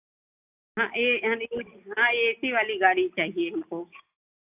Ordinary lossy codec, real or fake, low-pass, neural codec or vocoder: AAC, 32 kbps; real; 3.6 kHz; none